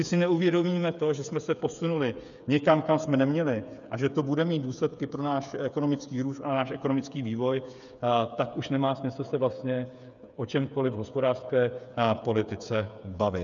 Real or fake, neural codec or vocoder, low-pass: fake; codec, 16 kHz, 8 kbps, FreqCodec, smaller model; 7.2 kHz